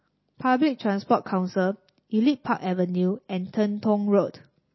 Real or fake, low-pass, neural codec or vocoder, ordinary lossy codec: real; 7.2 kHz; none; MP3, 24 kbps